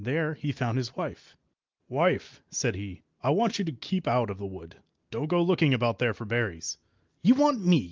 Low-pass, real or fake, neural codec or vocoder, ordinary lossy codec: 7.2 kHz; real; none; Opus, 32 kbps